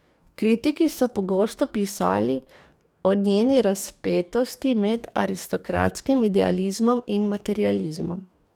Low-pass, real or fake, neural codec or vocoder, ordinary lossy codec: 19.8 kHz; fake; codec, 44.1 kHz, 2.6 kbps, DAC; none